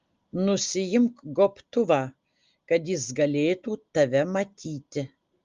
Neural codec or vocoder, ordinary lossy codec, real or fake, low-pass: none; Opus, 32 kbps; real; 7.2 kHz